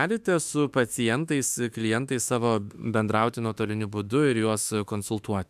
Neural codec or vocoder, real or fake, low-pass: autoencoder, 48 kHz, 32 numbers a frame, DAC-VAE, trained on Japanese speech; fake; 14.4 kHz